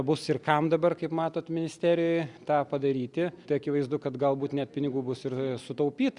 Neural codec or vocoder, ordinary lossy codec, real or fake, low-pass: none; Opus, 64 kbps; real; 10.8 kHz